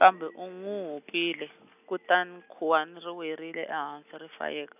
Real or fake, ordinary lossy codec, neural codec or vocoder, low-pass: real; none; none; 3.6 kHz